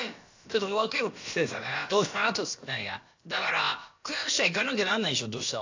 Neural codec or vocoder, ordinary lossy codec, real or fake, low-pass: codec, 16 kHz, about 1 kbps, DyCAST, with the encoder's durations; AAC, 48 kbps; fake; 7.2 kHz